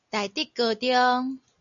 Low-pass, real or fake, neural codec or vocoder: 7.2 kHz; real; none